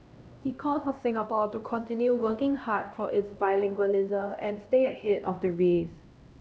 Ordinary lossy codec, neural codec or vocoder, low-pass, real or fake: none; codec, 16 kHz, 1 kbps, X-Codec, HuBERT features, trained on LibriSpeech; none; fake